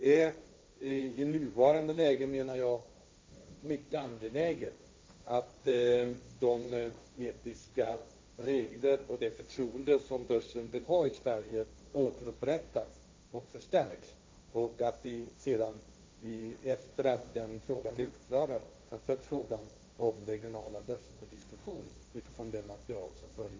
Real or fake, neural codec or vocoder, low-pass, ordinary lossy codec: fake; codec, 16 kHz, 1.1 kbps, Voila-Tokenizer; none; none